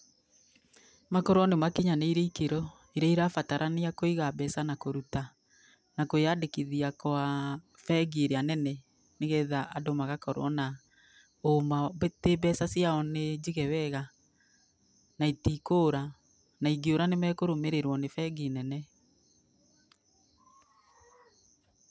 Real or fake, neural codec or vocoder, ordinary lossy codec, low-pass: real; none; none; none